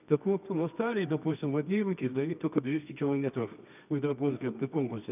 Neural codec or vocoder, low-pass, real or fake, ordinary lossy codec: codec, 24 kHz, 0.9 kbps, WavTokenizer, medium music audio release; 3.6 kHz; fake; none